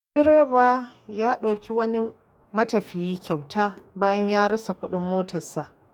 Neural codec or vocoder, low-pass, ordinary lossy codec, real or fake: codec, 44.1 kHz, 2.6 kbps, DAC; 19.8 kHz; none; fake